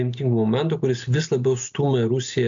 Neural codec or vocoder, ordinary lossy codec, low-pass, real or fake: none; AAC, 64 kbps; 7.2 kHz; real